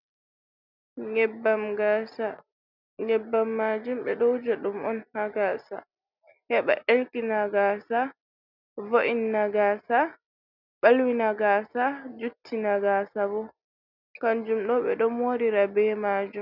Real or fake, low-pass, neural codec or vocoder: real; 5.4 kHz; none